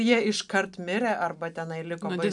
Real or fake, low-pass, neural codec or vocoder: real; 10.8 kHz; none